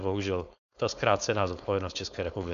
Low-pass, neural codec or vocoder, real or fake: 7.2 kHz; codec, 16 kHz, 4.8 kbps, FACodec; fake